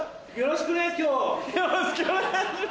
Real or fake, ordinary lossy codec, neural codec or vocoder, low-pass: real; none; none; none